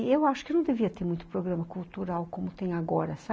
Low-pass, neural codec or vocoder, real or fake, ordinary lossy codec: none; none; real; none